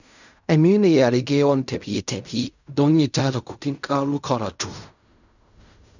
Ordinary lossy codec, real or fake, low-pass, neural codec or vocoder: none; fake; 7.2 kHz; codec, 16 kHz in and 24 kHz out, 0.4 kbps, LongCat-Audio-Codec, fine tuned four codebook decoder